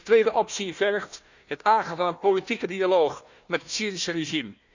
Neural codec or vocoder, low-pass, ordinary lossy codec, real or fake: codec, 16 kHz, 1 kbps, FunCodec, trained on Chinese and English, 50 frames a second; 7.2 kHz; Opus, 64 kbps; fake